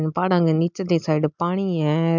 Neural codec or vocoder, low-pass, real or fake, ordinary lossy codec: none; 7.2 kHz; real; MP3, 64 kbps